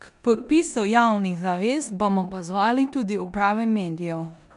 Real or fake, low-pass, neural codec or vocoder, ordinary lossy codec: fake; 10.8 kHz; codec, 16 kHz in and 24 kHz out, 0.9 kbps, LongCat-Audio-Codec, four codebook decoder; none